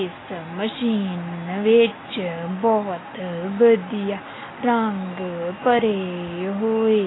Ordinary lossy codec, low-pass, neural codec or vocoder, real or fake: AAC, 16 kbps; 7.2 kHz; none; real